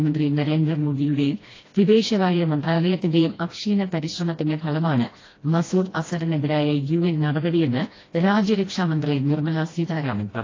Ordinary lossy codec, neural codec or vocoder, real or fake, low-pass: AAC, 32 kbps; codec, 16 kHz, 1 kbps, FreqCodec, smaller model; fake; 7.2 kHz